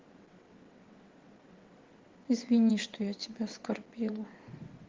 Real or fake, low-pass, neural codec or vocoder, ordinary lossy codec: fake; 7.2 kHz; vocoder, 22.05 kHz, 80 mel bands, WaveNeXt; Opus, 16 kbps